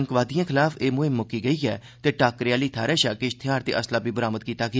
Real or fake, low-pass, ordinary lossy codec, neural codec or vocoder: real; none; none; none